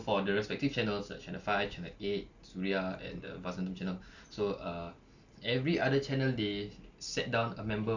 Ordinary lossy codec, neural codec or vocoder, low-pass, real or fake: none; none; 7.2 kHz; real